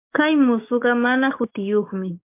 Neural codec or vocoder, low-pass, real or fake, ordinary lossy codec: codec, 44.1 kHz, 7.8 kbps, DAC; 3.6 kHz; fake; MP3, 32 kbps